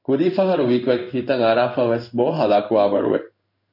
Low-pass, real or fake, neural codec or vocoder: 5.4 kHz; fake; codec, 16 kHz in and 24 kHz out, 1 kbps, XY-Tokenizer